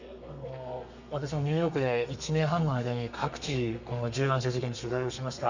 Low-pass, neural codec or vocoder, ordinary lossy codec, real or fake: 7.2 kHz; codec, 32 kHz, 1.9 kbps, SNAC; none; fake